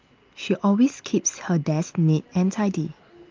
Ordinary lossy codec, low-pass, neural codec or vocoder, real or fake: Opus, 24 kbps; 7.2 kHz; none; real